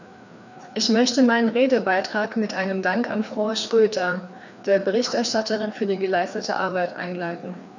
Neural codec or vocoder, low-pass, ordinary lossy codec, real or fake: codec, 16 kHz, 2 kbps, FreqCodec, larger model; 7.2 kHz; none; fake